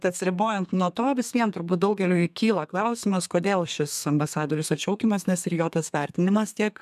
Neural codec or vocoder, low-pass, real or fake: codec, 32 kHz, 1.9 kbps, SNAC; 14.4 kHz; fake